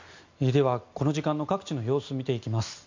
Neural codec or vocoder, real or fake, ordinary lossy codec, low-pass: none; real; MP3, 64 kbps; 7.2 kHz